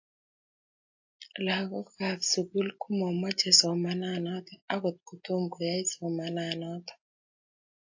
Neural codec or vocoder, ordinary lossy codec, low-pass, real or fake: none; AAC, 48 kbps; 7.2 kHz; real